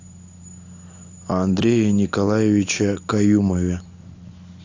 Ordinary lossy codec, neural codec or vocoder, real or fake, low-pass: AAC, 48 kbps; none; real; 7.2 kHz